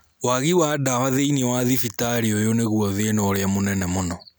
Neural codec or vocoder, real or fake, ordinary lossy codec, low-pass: none; real; none; none